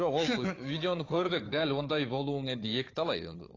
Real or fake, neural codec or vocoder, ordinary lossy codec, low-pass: fake; codec, 16 kHz, 4 kbps, FunCodec, trained on Chinese and English, 50 frames a second; AAC, 32 kbps; 7.2 kHz